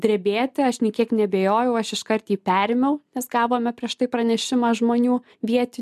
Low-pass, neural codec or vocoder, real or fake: 14.4 kHz; none; real